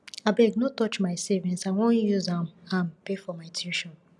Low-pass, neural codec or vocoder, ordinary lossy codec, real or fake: none; none; none; real